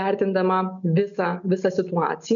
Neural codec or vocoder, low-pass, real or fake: none; 7.2 kHz; real